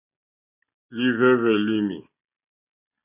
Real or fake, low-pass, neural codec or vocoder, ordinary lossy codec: real; 3.6 kHz; none; MP3, 32 kbps